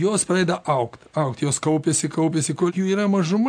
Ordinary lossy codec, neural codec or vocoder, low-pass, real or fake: AAC, 64 kbps; none; 9.9 kHz; real